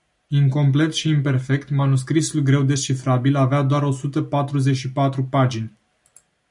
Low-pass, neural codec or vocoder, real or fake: 10.8 kHz; none; real